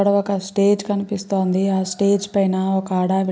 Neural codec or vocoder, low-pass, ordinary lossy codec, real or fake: none; none; none; real